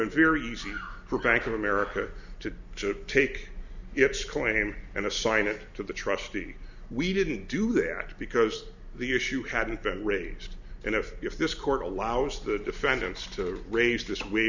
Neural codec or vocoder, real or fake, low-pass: none; real; 7.2 kHz